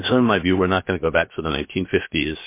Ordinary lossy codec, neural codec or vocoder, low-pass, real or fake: MP3, 24 kbps; codec, 16 kHz, 0.7 kbps, FocalCodec; 3.6 kHz; fake